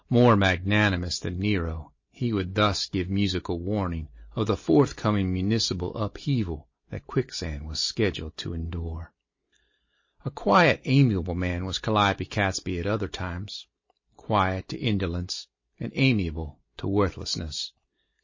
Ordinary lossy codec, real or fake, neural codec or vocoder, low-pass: MP3, 32 kbps; real; none; 7.2 kHz